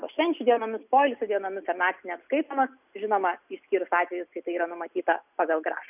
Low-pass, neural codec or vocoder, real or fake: 3.6 kHz; none; real